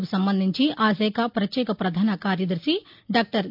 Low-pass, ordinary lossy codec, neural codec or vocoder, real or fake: 5.4 kHz; MP3, 48 kbps; none; real